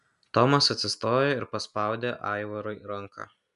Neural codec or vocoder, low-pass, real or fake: none; 10.8 kHz; real